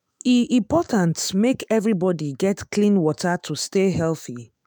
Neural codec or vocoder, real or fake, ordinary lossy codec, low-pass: autoencoder, 48 kHz, 128 numbers a frame, DAC-VAE, trained on Japanese speech; fake; none; none